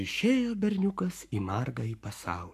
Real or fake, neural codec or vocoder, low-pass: fake; codec, 44.1 kHz, 7.8 kbps, Pupu-Codec; 14.4 kHz